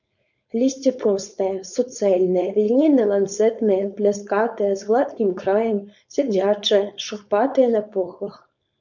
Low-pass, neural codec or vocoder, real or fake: 7.2 kHz; codec, 16 kHz, 4.8 kbps, FACodec; fake